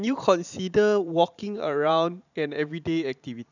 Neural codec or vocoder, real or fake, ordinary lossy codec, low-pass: vocoder, 44.1 kHz, 128 mel bands every 512 samples, BigVGAN v2; fake; none; 7.2 kHz